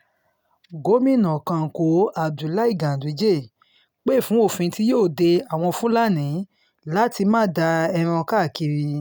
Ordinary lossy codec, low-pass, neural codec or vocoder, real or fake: none; none; none; real